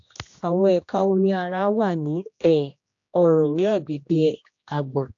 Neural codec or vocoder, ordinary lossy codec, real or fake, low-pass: codec, 16 kHz, 1 kbps, X-Codec, HuBERT features, trained on general audio; AAC, 48 kbps; fake; 7.2 kHz